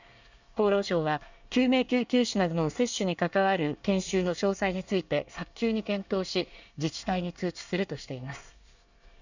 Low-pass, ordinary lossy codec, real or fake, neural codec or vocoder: 7.2 kHz; none; fake; codec, 24 kHz, 1 kbps, SNAC